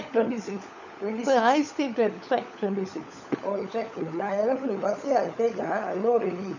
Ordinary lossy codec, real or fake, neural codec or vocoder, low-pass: none; fake; codec, 16 kHz, 16 kbps, FunCodec, trained on LibriTTS, 50 frames a second; 7.2 kHz